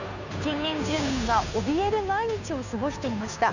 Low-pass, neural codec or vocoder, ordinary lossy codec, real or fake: 7.2 kHz; codec, 16 kHz, 2 kbps, FunCodec, trained on Chinese and English, 25 frames a second; none; fake